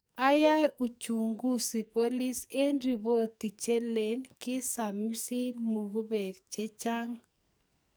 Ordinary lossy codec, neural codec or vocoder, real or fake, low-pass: none; codec, 44.1 kHz, 2.6 kbps, SNAC; fake; none